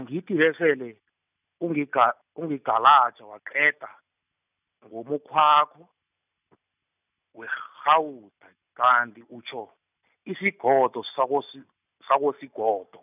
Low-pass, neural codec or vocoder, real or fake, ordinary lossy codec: 3.6 kHz; none; real; none